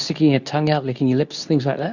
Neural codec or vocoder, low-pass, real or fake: codec, 24 kHz, 0.9 kbps, WavTokenizer, medium speech release version 2; 7.2 kHz; fake